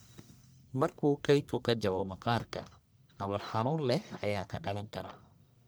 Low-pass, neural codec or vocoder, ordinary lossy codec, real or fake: none; codec, 44.1 kHz, 1.7 kbps, Pupu-Codec; none; fake